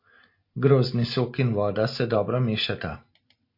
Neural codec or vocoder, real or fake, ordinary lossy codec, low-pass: none; real; MP3, 32 kbps; 5.4 kHz